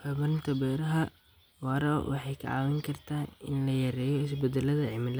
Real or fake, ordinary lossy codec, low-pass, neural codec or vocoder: real; none; none; none